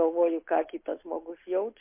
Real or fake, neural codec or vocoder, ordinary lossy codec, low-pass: real; none; MP3, 24 kbps; 3.6 kHz